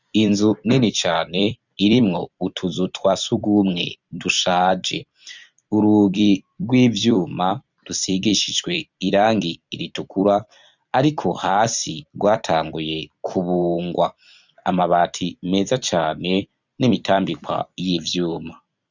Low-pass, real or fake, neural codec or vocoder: 7.2 kHz; fake; vocoder, 44.1 kHz, 128 mel bands every 256 samples, BigVGAN v2